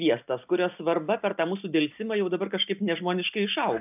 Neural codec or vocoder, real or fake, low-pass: none; real; 3.6 kHz